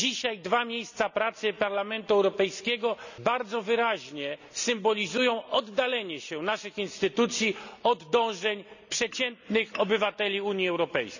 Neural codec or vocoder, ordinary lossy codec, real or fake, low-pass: none; none; real; 7.2 kHz